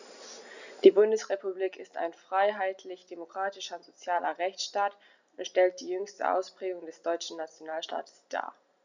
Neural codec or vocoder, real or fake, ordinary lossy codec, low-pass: none; real; none; 7.2 kHz